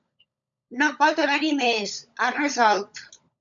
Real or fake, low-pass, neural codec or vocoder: fake; 7.2 kHz; codec, 16 kHz, 16 kbps, FunCodec, trained on LibriTTS, 50 frames a second